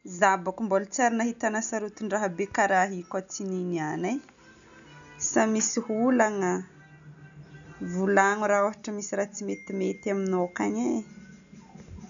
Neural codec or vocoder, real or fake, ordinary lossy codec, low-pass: none; real; none; 7.2 kHz